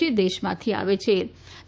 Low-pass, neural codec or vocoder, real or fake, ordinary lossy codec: none; codec, 16 kHz, 6 kbps, DAC; fake; none